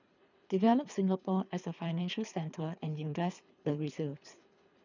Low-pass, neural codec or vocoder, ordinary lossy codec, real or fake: 7.2 kHz; codec, 24 kHz, 3 kbps, HILCodec; none; fake